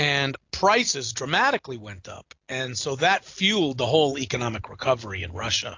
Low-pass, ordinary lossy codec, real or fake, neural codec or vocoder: 7.2 kHz; AAC, 48 kbps; real; none